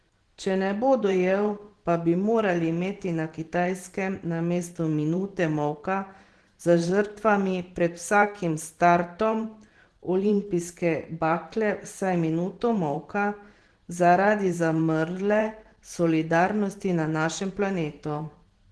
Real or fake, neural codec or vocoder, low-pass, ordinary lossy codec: fake; vocoder, 44.1 kHz, 128 mel bands every 512 samples, BigVGAN v2; 10.8 kHz; Opus, 16 kbps